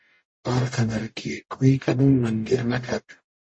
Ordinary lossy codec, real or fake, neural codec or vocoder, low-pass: MP3, 32 kbps; fake; codec, 44.1 kHz, 0.9 kbps, DAC; 9.9 kHz